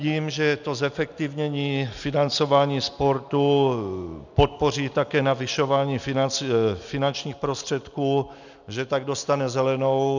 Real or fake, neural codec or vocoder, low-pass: real; none; 7.2 kHz